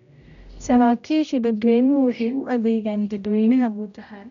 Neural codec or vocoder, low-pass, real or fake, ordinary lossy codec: codec, 16 kHz, 0.5 kbps, X-Codec, HuBERT features, trained on general audio; 7.2 kHz; fake; MP3, 96 kbps